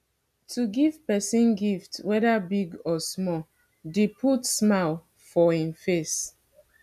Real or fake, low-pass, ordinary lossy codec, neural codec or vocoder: real; 14.4 kHz; none; none